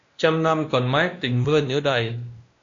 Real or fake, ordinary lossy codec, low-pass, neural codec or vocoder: fake; AAC, 48 kbps; 7.2 kHz; codec, 16 kHz, 1 kbps, X-Codec, WavLM features, trained on Multilingual LibriSpeech